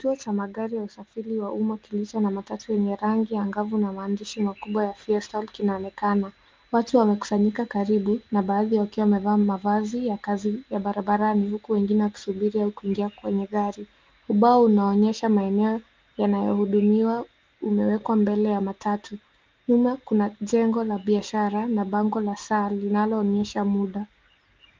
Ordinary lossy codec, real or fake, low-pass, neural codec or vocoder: Opus, 32 kbps; real; 7.2 kHz; none